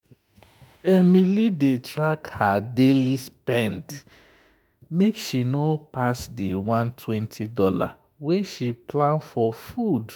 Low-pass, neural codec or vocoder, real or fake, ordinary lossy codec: none; autoencoder, 48 kHz, 32 numbers a frame, DAC-VAE, trained on Japanese speech; fake; none